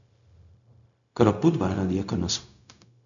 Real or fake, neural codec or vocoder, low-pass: fake; codec, 16 kHz, 0.4 kbps, LongCat-Audio-Codec; 7.2 kHz